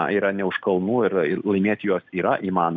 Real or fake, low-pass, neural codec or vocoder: real; 7.2 kHz; none